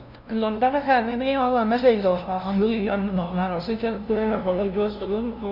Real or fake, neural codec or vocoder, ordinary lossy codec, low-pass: fake; codec, 16 kHz, 0.5 kbps, FunCodec, trained on LibriTTS, 25 frames a second; none; 5.4 kHz